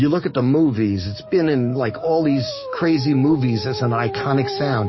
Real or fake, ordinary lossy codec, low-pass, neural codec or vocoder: fake; MP3, 24 kbps; 7.2 kHz; codec, 44.1 kHz, 7.8 kbps, DAC